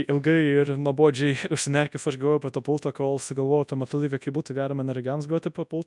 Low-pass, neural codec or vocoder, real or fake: 10.8 kHz; codec, 24 kHz, 0.9 kbps, WavTokenizer, large speech release; fake